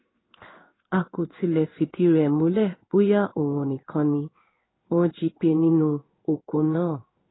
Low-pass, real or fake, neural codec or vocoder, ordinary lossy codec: 7.2 kHz; fake; codec, 16 kHz in and 24 kHz out, 1 kbps, XY-Tokenizer; AAC, 16 kbps